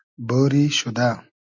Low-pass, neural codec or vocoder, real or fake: 7.2 kHz; none; real